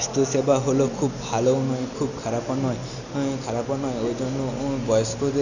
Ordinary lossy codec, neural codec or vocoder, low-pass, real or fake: none; none; 7.2 kHz; real